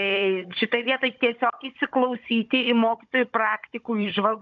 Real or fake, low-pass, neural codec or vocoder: fake; 7.2 kHz; codec, 16 kHz, 16 kbps, FunCodec, trained on Chinese and English, 50 frames a second